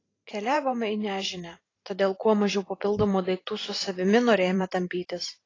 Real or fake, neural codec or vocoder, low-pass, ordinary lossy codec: fake; vocoder, 44.1 kHz, 128 mel bands every 512 samples, BigVGAN v2; 7.2 kHz; AAC, 32 kbps